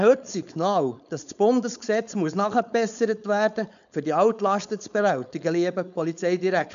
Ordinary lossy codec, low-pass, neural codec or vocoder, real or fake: none; 7.2 kHz; codec, 16 kHz, 4.8 kbps, FACodec; fake